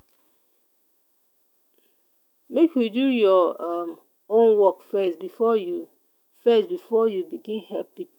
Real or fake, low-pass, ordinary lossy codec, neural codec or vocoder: fake; 19.8 kHz; none; autoencoder, 48 kHz, 128 numbers a frame, DAC-VAE, trained on Japanese speech